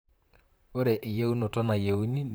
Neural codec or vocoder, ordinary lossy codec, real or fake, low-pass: vocoder, 44.1 kHz, 128 mel bands, Pupu-Vocoder; none; fake; none